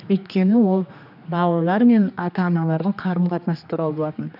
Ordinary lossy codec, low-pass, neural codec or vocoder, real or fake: none; 5.4 kHz; codec, 16 kHz, 2 kbps, X-Codec, HuBERT features, trained on general audio; fake